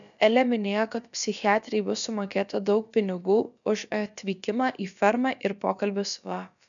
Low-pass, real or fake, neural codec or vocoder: 7.2 kHz; fake; codec, 16 kHz, about 1 kbps, DyCAST, with the encoder's durations